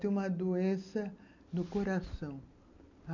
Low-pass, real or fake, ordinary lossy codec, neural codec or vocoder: 7.2 kHz; real; none; none